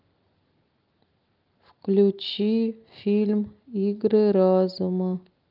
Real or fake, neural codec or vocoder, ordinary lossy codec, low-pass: real; none; Opus, 32 kbps; 5.4 kHz